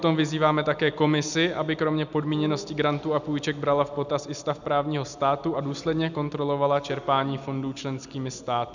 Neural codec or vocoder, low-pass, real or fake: none; 7.2 kHz; real